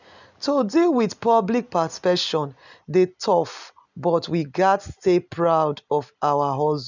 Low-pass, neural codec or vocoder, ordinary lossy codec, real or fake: 7.2 kHz; none; none; real